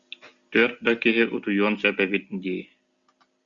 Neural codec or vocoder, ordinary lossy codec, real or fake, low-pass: none; Opus, 64 kbps; real; 7.2 kHz